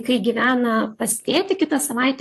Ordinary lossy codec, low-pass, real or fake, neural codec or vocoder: AAC, 64 kbps; 14.4 kHz; fake; vocoder, 44.1 kHz, 128 mel bands, Pupu-Vocoder